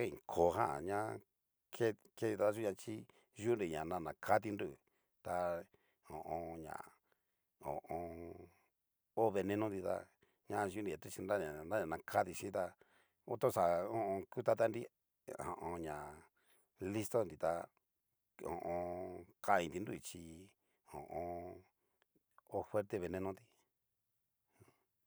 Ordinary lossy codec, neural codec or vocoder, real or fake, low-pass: none; none; real; none